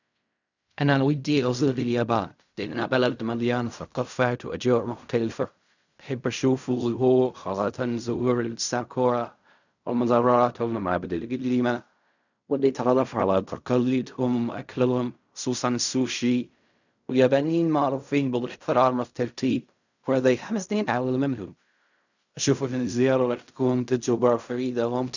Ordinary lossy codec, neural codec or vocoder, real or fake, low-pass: none; codec, 16 kHz in and 24 kHz out, 0.4 kbps, LongCat-Audio-Codec, fine tuned four codebook decoder; fake; 7.2 kHz